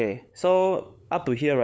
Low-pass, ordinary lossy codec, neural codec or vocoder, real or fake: none; none; codec, 16 kHz, 8 kbps, FunCodec, trained on LibriTTS, 25 frames a second; fake